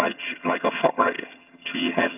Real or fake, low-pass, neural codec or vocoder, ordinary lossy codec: fake; 3.6 kHz; vocoder, 22.05 kHz, 80 mel bands, HiFi-GAN; none